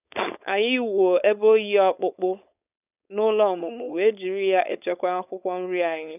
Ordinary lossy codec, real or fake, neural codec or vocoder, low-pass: none; fake; codec, 16 kHz, 4.8 kbps, FACodec; 3.6 kHz